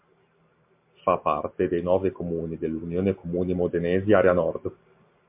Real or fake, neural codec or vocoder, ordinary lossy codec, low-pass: real; none; MP3, 32 kbps; 3.6 kHz